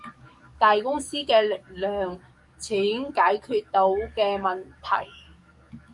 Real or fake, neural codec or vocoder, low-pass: fake; codec, 44.1 kHz, 7.8 kbps, DAC; 10.8 kHz